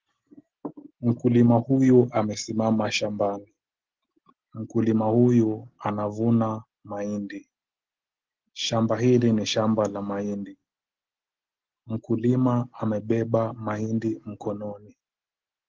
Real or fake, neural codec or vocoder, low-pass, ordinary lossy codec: real; none; 7.2 kHz; Opus, 16 kbps